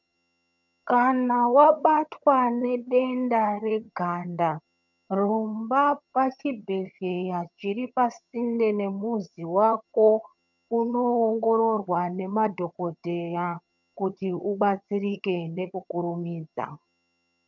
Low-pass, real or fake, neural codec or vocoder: 7.2 kHz; fake; vocoder, 22.05 kHz, 80 mel bands, HiFi-GAN